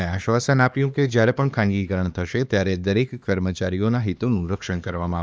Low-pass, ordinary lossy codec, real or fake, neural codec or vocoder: none; none; fake; codec, 16 kHz, 2 kbps, X-Codec, HuBERT features, trained on LibriSpeech